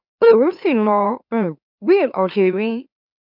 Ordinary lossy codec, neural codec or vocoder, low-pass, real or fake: none; autoencoder, 44.1 kHz, a latent of 192 numbers a frame, MeloTTS; 5.4 kHz; fake